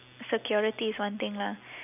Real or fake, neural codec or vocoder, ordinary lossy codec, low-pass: real; none; none; 3.6 kHz